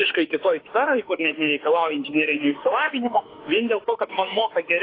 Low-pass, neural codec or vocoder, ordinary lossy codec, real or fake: 5.4 kHz; autoencoder, 48 kHz, 32 numbers a frame, DAC-VAE, trained on Japanese speech; AAC, 24 kbps; fake